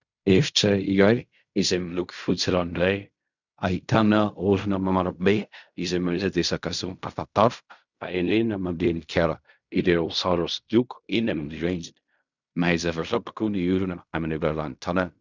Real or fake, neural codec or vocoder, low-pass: fake; codec, 16 kHz in and 24 kHz out, 0.4 kbps, LongCat-Audio-Codec, fine tuned four codebook decoder; 7.2 kHz